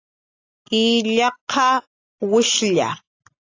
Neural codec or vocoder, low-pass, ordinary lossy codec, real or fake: none; 7.2 kHz; AAC, 32 kbps; real